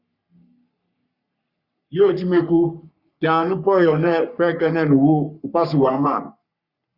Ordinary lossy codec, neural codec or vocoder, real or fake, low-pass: Opus, 64 kbps; codec, 44.1 kHz, 3.4 kbps, Pupu-Codec; fake; 5.4 kHz